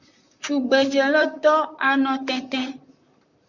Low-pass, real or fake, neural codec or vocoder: 7.2 kHz; fake; vocoder, 44.1 kHz, 128 mel bands, Pupu-Vocoder